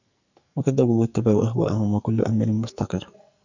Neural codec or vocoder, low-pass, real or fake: codec, 44.1 kHz, 2.6 kbps, SNAC; 7.2 kHz; fake